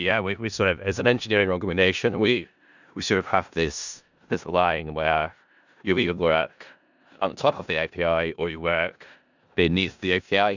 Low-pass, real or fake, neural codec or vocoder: 7.2 kHz; fake; codec, 16 kHz in and 24 kHz out, 0.4 kbps, LongCat-Audio-Codec, four codebook decoder